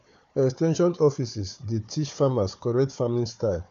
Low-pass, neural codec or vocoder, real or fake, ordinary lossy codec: 7.2 kHz; codec, 16 kHz, 4 kbps, FunCodec, trained on Chinese and English, 50 frames a second; fake; AAC, 64 kbps